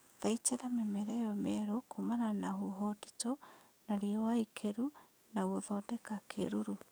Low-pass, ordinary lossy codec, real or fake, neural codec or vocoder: none; none; fake; codec, 44.1 kHz, 7.8 kbps, DAC